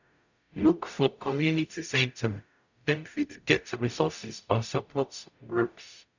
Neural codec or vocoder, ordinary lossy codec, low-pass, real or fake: codec, 44.1 kHz, 0.9 kbps, DAC; none; 7.2 kHz; fake